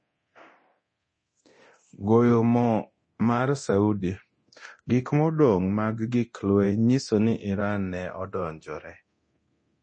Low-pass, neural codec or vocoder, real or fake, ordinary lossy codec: 9.9 kHz; codec, 24 kHz, 0.9 kbps, DualCodec; fake; MP3, 32 kbps